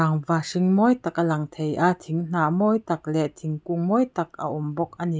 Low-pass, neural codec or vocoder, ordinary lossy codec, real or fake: none; none; none; real